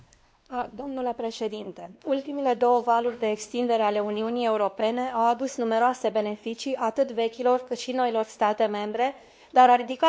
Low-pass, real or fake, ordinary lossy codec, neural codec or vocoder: none; fake; none; codec, 16 kHz, 2 kbps, X-Codec, WavLM features, trained on Multilingual LibriSpeech